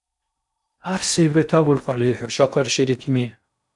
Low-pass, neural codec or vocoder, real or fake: 10.8 kHz; codec, 16 kHz in and 24 kHz out, 0.6 kbps, FocalCodec, streaming, 4096 codes; fake